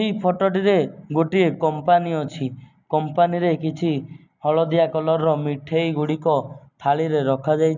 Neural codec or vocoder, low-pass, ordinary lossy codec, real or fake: none; 7.2 kHz; none; real